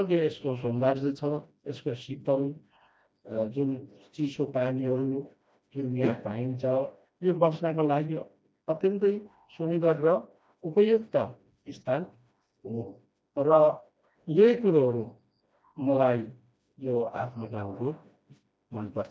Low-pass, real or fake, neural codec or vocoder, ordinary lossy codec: none; fake; codec, 16 kHz, 1 kbps, FreqCodec, smaller model; none